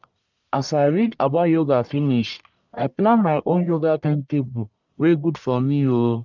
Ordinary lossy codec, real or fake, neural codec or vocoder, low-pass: none; fake; codec, 44.1 kHz, 1.7 kbps, Pupu-Codec; 7.2 kHz